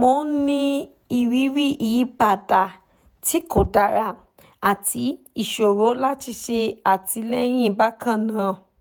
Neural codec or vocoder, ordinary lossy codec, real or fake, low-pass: vocoder, 48 kHz, 128 mel bands, Vocos; none; fake; none